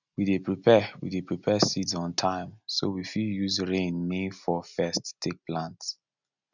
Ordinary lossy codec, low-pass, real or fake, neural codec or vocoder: none; 7.2 kHz; real; none